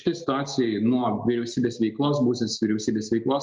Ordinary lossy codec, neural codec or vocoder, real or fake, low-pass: Opus, 24 kbps; none; real; 7.2 kHz